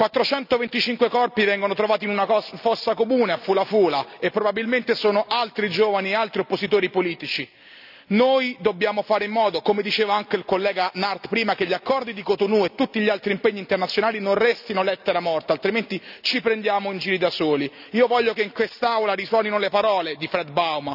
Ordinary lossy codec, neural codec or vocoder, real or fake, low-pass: none; none; real; 5.4 kHz